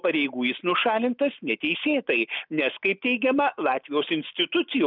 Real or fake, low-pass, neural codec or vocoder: real; 5.4 kHz; none